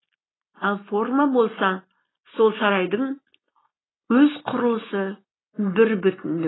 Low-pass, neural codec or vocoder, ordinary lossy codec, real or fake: 7.2 kHz; none; AAC, 16 kbps; real